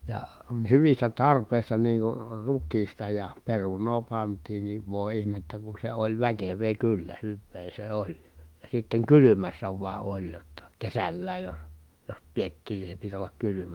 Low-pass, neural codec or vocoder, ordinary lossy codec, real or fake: 19.8 kHz; autoencoder, 48 kHz, 32 numbers a frame, DAC-VAE, trained on Japanese speech; Opus, 32 kbps; fake